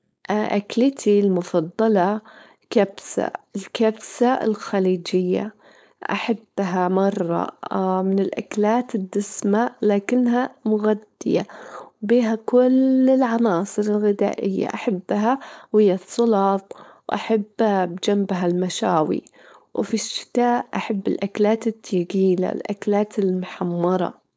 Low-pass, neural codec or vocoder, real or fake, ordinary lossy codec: none; codec, 16 kHz, 4.8 kbps, FACodec; fake; none